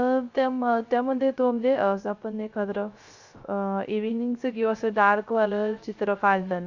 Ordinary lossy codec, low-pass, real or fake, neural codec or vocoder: Opus, 64 kbps; 7.2 kHz; fake; codec, 16 kHz, 0.3 kbps, FocalCodec